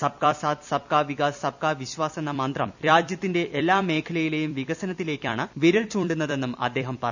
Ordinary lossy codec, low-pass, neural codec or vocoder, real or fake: none; 7.2 kHz; vocoder, 44.1 kHz, 128 mel bands every 256 samples, BigVGAN v2; fake